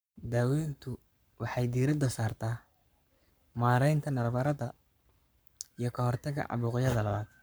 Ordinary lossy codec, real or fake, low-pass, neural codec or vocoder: none; fake; none; codec, 44.1 kHz, 7.8 kbps, Pupu-Codec